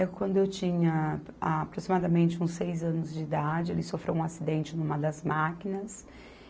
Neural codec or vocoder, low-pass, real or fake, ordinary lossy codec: none; none; real; none